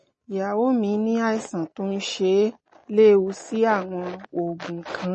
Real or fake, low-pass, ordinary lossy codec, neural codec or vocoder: real; 10.8 kHz; MP3, 32 kbps; none